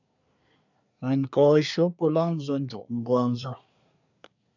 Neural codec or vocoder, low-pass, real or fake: codec, 24 kHz, 1 kbps, SNAC; 7.2 kHz; fake